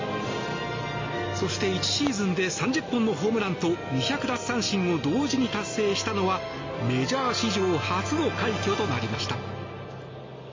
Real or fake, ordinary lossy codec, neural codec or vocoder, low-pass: real; MP3, 32 kbps; none; 7.2 kHz